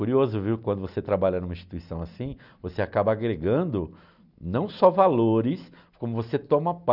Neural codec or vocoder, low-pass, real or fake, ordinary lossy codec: none; 5.4 kHz; real; none